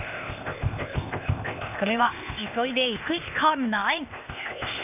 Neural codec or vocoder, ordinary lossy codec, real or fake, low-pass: codec, 16 kHz, 0.8 kbps, ZipCodec; none; fake; 3.6 kHz